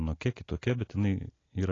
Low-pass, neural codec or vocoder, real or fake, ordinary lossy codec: 7.2 kHz; none; real; AAC, 32 kbps